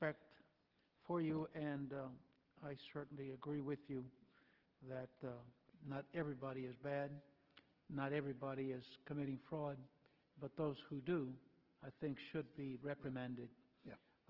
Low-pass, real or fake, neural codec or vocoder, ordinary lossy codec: 5.4 kHz; real; none; Opus, 16 kbps